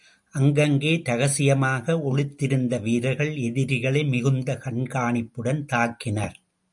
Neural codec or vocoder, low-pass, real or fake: none; 10.8 kHz; real